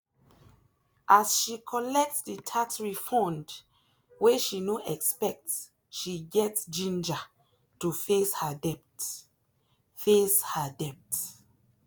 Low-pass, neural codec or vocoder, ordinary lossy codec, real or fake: none; none; none; real